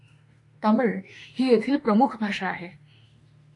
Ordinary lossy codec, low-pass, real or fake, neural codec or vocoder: AAC, 48 kbps; 10.8 kHz; fake; autoencoder, 48 kHz, 32 numbers a frame, DAC-VAE, trained on Japanese speech